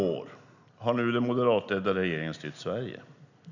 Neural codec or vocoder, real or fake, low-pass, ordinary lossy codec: none; real; 7.2 kHz; none